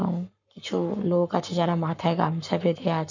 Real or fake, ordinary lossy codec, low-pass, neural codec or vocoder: fake; none; 7.2 kHz; codec, 44.1 kHz, 7.8 kbps, Pupu-Codec